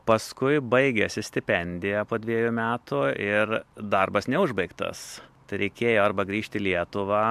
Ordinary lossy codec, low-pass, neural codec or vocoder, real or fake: MP3, 96 kbps; 14.4 kHz; none; real